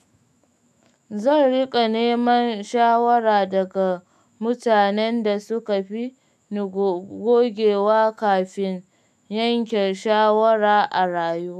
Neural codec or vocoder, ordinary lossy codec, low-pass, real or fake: autoencoder, 48 kHz, 128 numbers a frame, DAC-VAE, trained on Japanese speech; none; 14.4 kHz; fake